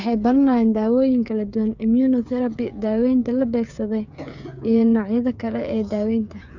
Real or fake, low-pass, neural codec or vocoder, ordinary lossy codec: fake; 7.2 kHz; codec, 16 kHz, 8 kbps, FreqCodec, smaller model; none